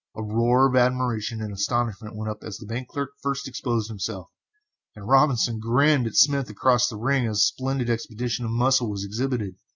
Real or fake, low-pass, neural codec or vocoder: real; 7.2 kHz; none